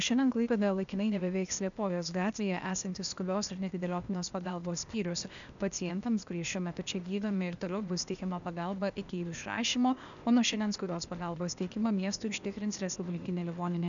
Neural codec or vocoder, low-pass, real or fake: codec, 16 kHz, 0.8 kbps, ZipCodec; 7.2 kHz; fake